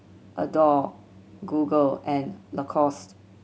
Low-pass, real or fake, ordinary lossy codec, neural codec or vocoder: none; real; none; none